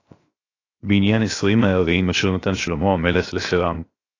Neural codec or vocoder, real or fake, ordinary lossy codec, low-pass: codec, 16 kHz, 0.7 kbps, FocalCodec; fake; AAC, 32 kbps; 7.2 kHz